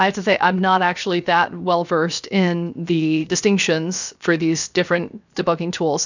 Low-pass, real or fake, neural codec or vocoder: 7.2 kHz; fake; codec, 16 kHz, 0.7 kbps, FocalCodec